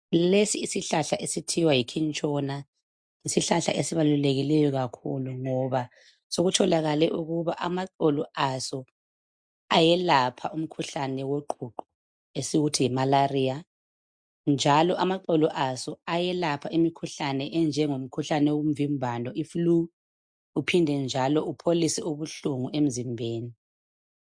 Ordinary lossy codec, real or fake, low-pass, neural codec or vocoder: MP3, 64 kbps; real; 9.9 kHz; none